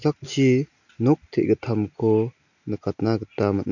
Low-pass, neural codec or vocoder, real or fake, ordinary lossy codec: 7.2 kHz; none; real; none